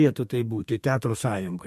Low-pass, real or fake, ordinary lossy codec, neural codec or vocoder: 14.4 kHz; fake; MP3, 64 kbps; codec, 32 kHz, 1.9 kbps, SNAC